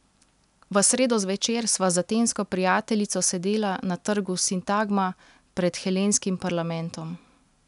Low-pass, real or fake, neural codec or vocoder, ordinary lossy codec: 10.8 kHz; real; none; none